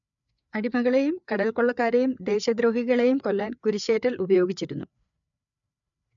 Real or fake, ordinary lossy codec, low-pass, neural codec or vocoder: fake; none; 7.2 kHz; codec, 16 kHz, 4 kbps, FreqCodec, larger model